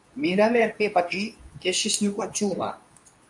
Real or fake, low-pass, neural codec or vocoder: fake; 10.8 kHz; codec, 24 kHz, 0.9 kbps, WavTokenizer, medium speech release version 2